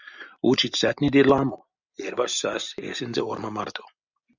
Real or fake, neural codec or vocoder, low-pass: real; none; 7.2 kHz